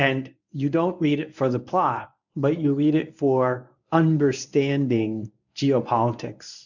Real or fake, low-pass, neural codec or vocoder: fake; 7.2 kHz; codec, 24 kHz, 0.9 kbps, WavTokenizer, medium speech release version 1